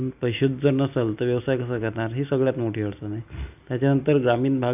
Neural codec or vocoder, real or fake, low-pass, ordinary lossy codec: none; real; 3.6 kHz; AAC, 32 kbps